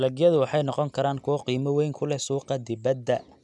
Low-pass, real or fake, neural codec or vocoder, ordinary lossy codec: 10.8 kHz; real; none; none